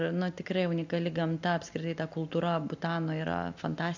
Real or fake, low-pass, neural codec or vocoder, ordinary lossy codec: real; 7.2 kHz; none; MP3, 64 kbps